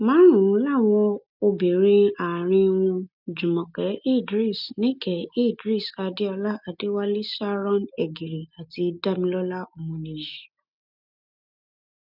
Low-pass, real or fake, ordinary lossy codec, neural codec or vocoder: 5.4 kHz; real; none; none